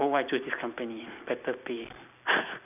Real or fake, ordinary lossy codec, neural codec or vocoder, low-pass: real; none; none; 3.6 kHz